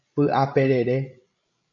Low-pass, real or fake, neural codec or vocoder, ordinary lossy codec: 7.2 kHz; fake; codec, 16 kHz, 16 kbps, FreqCodec, larger model; MP3, 96 kbps